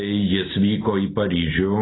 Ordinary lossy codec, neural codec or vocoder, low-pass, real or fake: AAC, 16 kbps; none; 7.2 kHz; real